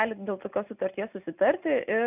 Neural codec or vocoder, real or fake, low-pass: none; real; 3.6 kHz